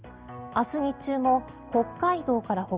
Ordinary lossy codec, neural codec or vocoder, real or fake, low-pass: Opus, 24 kbps; none; real; 3.6 kHz